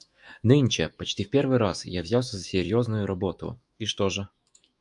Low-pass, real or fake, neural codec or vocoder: 10.8 kHz; fake; autoencoder, 48 kHz, 128 numbers a frame, DAC-VAE, trained on Japanese speech